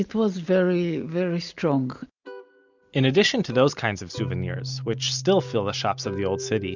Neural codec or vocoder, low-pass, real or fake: none; 7.2 kHz; real